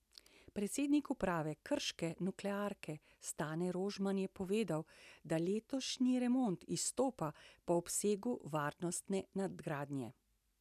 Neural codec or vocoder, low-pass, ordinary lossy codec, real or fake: none; 14.4 kHz; none; real